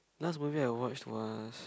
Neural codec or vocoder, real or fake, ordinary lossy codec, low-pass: none; real; none; none